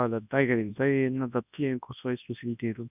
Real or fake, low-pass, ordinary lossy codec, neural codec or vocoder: fake; 3.6 kHz; none; codec, 24 kHz, 0.9 kbps, WavTokenizer, large speech release